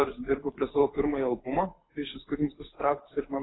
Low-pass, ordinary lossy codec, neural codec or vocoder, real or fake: 7.2 kHz; AAC, 16 kbps; codec, 24 kHz, 6 kbps, HILCodec; fake